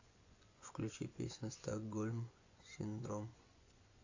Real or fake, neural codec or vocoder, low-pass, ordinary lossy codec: fake; vocoder, 24 kHz, 100 mel bands, Vocos; 7.2 kHz; AAC, 48 kbps